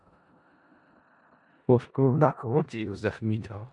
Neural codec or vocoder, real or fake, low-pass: codec, 16 kHz in and 24 kHz out, 0.4 kbps, LongCat-Audio-Codec, four codebook decoder; fake; 10.8 kHz